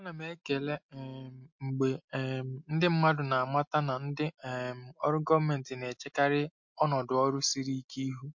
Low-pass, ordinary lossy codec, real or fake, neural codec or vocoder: 7.2 kHz; MP3, 48 kbps; real; none